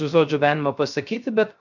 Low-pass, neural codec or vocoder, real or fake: 7.2 kHz; codec, 16 kHz, 0.3 kbps, FocalCodec; fake